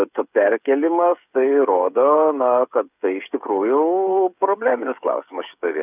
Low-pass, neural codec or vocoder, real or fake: 3.6 kHz; codec, 16 kHz, 8 kbps, FreqCodec, smaller model; fake